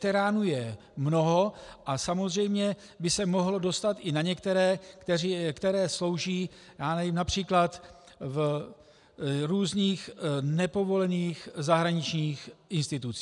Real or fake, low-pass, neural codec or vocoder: real; 10.8 kHz; none